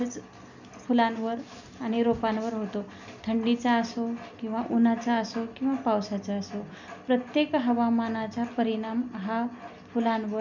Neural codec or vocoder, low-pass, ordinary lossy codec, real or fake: none; 7.2 kHz; none; real